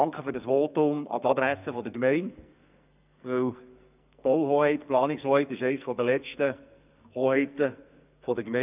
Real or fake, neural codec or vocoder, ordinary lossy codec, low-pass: fake; codec, 44.1 kHz, 2.6 kbps, SNAC; none; 3.6 kHz